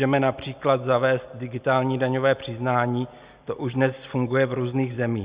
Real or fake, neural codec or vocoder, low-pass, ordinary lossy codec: real; none; 3.6 kHz; Opus, 64 kbps